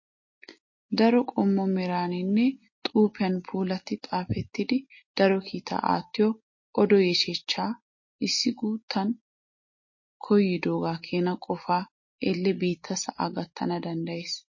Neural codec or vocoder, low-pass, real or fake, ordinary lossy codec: none; 7.2 kHz; real; MP3, 32 kbps